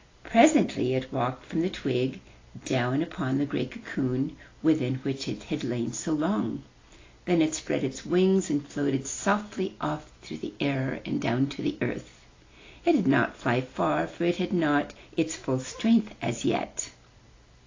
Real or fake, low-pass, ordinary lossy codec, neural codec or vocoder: real; 7.2 kHz; AAC, 32 kbps; none